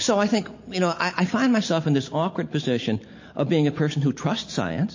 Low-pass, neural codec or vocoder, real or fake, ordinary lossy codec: 7.2 kHz; none; real; MP3, 32 kbps